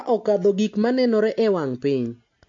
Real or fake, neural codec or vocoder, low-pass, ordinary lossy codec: real; none; 7.2 kHz; MP3, 48 kbps